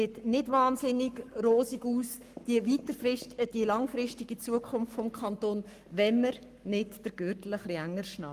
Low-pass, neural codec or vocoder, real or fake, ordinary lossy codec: 14.4 kHz; codec, 44.1 kHz, 7.8 kbps, Pupu-Codec; fake; Opus, 32 kbps